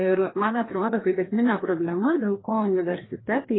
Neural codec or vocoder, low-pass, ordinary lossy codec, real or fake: codec, 16 kHz, 1 kbps, FreqCodec, larger model; 7.2 kHz; AAC, 16 kbps; fake